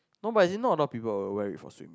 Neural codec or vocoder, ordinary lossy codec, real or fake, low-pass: none; none; real; none